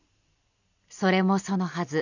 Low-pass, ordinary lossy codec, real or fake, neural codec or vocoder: 7.2 kHz; none; real; none